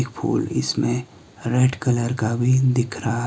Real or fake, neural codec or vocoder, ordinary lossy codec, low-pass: real; none; none; none